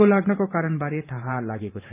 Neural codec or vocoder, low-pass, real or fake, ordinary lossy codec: vocoder, 44.1 kHz, 128 mel bands every 512 samples, BigVGAN v2; 3.6 kHz; fake; none